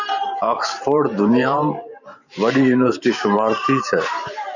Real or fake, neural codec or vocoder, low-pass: fake; vocoder, 44.1 kHz, 128 mel bands every 512 samples, BigVGAN v2; 7.2 kHz